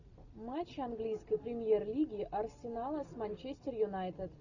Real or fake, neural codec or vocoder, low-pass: real; none; 7.2 kHz